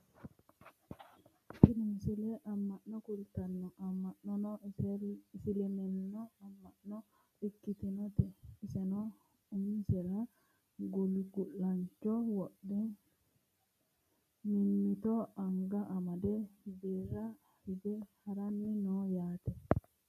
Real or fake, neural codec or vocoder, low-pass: real; none; 14.4 kHz